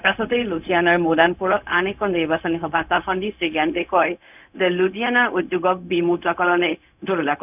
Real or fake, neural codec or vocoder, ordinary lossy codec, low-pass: fake; codec, 16 kHz, 0.4 kbps, LongCat-Audio-Codec; none; 3.6 kHz